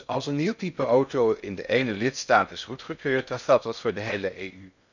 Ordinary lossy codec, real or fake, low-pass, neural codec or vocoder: none; fake; 7.2 kHz; codec, 16 kHz in and 24 kHz out, 0.6 kbps, FocalCodec, streaming, 2048 codes